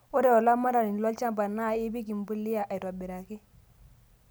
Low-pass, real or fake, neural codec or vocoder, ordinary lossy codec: none; real; none; none